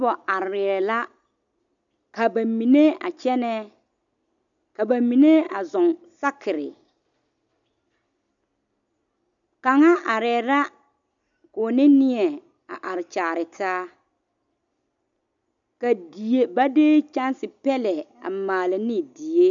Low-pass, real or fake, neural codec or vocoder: 7.2 kHz; real; none